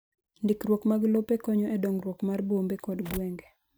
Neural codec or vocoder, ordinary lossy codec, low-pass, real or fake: none; none; none; real